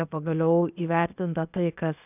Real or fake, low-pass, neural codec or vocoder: fake; 3.6 kHz; codec, 16 kHz, 0.8 kbps, ZipCodec